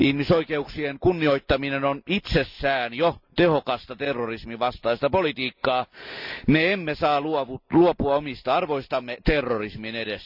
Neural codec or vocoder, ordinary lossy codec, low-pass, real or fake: none; none; 5.4 kHz; real